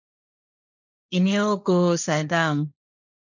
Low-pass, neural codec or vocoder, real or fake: 7.2 kHz; codec, 16 kHz, 1.1 kbps, Voila-Tokenizer; fake